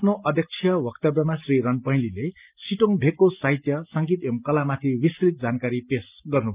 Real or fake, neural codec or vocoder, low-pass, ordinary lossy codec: real; none; 3.6 kHz; Opus, 24 kbps